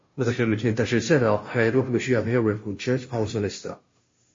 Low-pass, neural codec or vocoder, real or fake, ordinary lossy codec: 7.2 kHz; codec, 16 kHz, 0.5 kbps, FunCodec, trained on Chinese and English, 25 frames a second; fake; MP3, 32 kbps